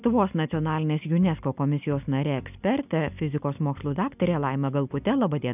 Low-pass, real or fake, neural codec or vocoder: 3.6 kHz; real; none